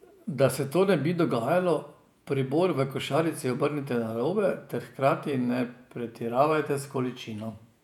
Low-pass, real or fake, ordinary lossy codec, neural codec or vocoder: 19.8 kHz; fake; none; vocoder, 48 kHz, 128 mel bands, Vocos